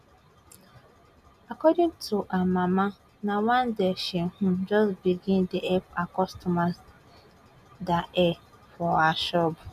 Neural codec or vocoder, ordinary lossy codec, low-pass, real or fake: none; none; 14.4 kHz; real